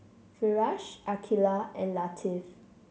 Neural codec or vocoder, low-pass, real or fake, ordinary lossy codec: none; none; real; none